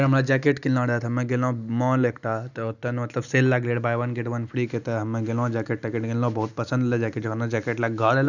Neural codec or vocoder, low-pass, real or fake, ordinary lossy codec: none; 7.2 kHz; real; none